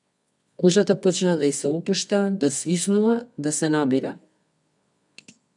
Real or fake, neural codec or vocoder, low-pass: fake; codec, 24 kHz, 0.9 kbps, WavTokenizer, medium music audio release; 10.8 kHz